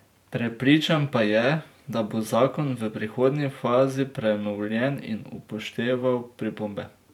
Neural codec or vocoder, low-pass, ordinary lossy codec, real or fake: vocoder, 44.1 kHz, 128 mel bands every 512 samples, BigVGAN v2; 19.8 kHz; none; fake